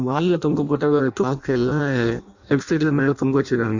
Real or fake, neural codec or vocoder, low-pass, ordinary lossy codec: fake; codec, 16 kHz in and 24 kHz out, 0.6 kbps, FireRedTTS-2 codec; 7.2 kHz; Opus, 64 kbps